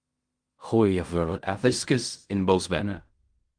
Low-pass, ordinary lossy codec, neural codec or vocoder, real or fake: 9.9 kHz; Opus, 32 kbps; codec, 16 kHz in and 24 kHz out, 0.4 kbps, LongCat-Audio-Codec, fine tuned four codebook decoder; fake